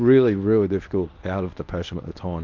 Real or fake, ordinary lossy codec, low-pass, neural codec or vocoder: fake; Opus, 24 kbps; 7.2 kHz; codec, 24 kHz, 0.9 kbps, WavTokenizer, medium speech release version 1